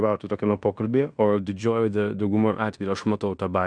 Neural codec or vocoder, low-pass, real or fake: codec, 16 kHz in and 24 kHz out, 0.9 kbps, LongCat-Audio-Codec, fine tuned four codebook decoder; 9.9 kHz; fake